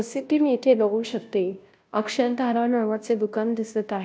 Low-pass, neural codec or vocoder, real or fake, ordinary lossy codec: none; codec, 16 kHz, 0.5 kbps, FunCodec, trained on Chinese and English, 25 frames a second; fake; none